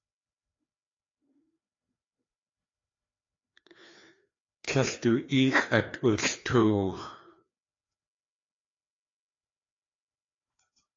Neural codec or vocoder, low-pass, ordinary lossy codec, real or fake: codec, 16 kHz, 2 kbps, FreqCodec, larger model; 7.2 kHz; AAC, 32 kbps; fake